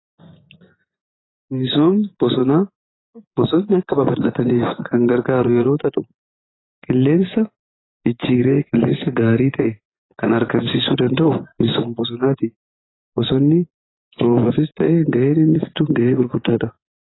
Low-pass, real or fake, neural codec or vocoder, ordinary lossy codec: 7.2 kHz; fake; vocoder, 24 kHz, 100 mel bands, Vocos; AAC, 16 kbps